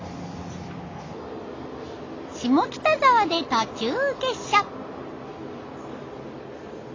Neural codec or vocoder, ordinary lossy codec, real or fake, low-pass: none; AAC, 32 kbps; real; 7.2 kHz